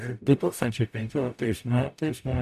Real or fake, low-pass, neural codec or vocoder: fake; 14.4 kHz; codec, 44.1 kHz, 0.9 kbps, DAC